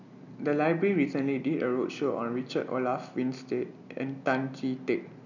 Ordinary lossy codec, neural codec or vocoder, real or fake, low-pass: none; none; real; 7.2 kHz